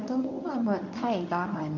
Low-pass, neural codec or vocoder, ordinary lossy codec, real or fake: 7.2 kHz; codec, 24 kHz, 0.9 kbps, WavTokenizer, medium speech release version 1; MP3, 48 kbps; fake